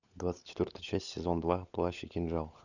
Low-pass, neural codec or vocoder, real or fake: 7.2 kHz; codec, 16 kHz, 16 kbps, FunCodec, trained on LibriTTS, 50 frames a second; fake